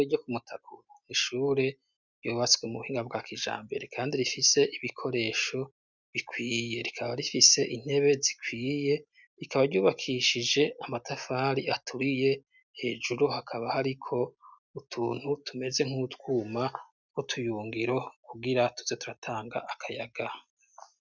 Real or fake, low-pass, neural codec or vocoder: real; 7.2 kHz; none